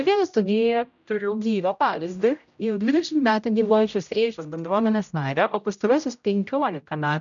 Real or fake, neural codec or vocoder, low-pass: fake; codec, 16 kHz, 0.5 kbps, X-Codec, HuBERT features, trained on general audio; 7.2 kHz